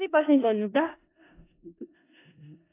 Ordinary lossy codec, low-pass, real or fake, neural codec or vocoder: AAC, 16 kbps; 3.6 kHz; fake; codec, 16 kHz in and 24 kHz out, 0.4 kbps, LongCat-Audio-Codec, four codebook decoder